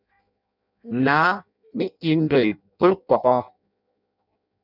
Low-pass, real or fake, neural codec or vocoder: 5.4 kHz; fake; codec, 16 kHz in and 24 kHz out, 0.6 kbps, FireRedTTS-2 codec